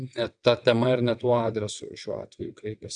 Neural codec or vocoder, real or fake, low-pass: vocoder, 22.05 kHz, 80 mel bands, WaveNeXt; fake; 9.9 kHz